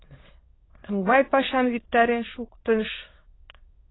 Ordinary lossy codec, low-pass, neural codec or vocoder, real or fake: AAC, 16 kbps; 7.2 kHz; autoencoder, 22.05 kHz, a latent of 192 numbers a frame, VITS, trained on many speakers; fake